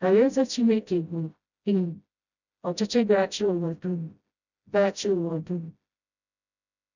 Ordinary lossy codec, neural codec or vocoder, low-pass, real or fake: none; codec, 16 kHz, 0.5 kbps, FreqCodec, smaller model; 7.2 kHz; fake